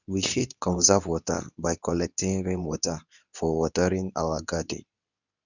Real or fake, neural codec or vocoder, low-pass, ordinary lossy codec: fake; codec, 24 kHz, 0.9 kbps, WavTokenizer, medium speech release version 2; 7.2 kHz; none